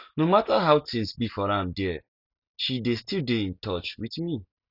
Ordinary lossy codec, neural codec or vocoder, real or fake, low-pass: none; none; real; 5.4 kHz